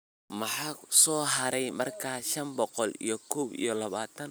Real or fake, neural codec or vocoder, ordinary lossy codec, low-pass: real; none; none; none